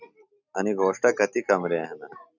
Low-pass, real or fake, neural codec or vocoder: 7.2 kHz; real; none